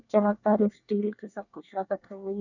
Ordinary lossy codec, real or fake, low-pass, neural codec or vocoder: none; fake; 7.2 kHz; codec, 24 kHz, 1 kbps, SNAC